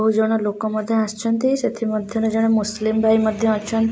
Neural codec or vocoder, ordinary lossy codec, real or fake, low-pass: none; none; real; none